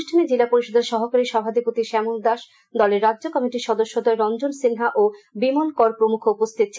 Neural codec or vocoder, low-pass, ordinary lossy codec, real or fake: none; none; none; real